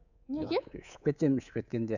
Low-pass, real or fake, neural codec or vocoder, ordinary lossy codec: 7.2 kHz; fake; codec, 24 kHz, 3.1 kbps, DualCodec; none